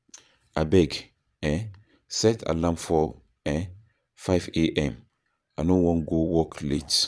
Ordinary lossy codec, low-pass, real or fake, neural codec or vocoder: none; none; fake; vocoder, 22.05 kHz, 80 mel bands, Vocos